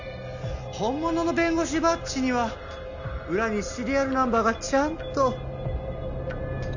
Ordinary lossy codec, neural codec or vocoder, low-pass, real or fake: none; none; 7.2 kHz; real